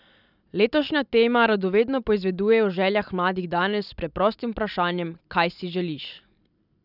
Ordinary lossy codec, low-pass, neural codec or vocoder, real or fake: none; 5.4 kHz; none; real